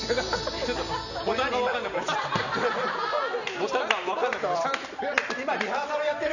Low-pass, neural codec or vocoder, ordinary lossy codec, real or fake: 7.2 kHz; none; none; real